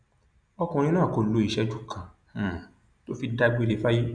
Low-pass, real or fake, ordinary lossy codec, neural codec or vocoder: 9.9 kHz; real; none; none